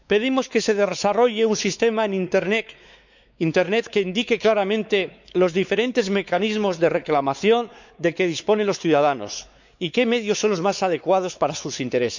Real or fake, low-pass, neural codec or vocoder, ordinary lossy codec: fake; 7.2 kHz; codec, 16 kHz, 4 kbps, X-Codec, WavLM features, trained on Multilingual LibriSpeech; none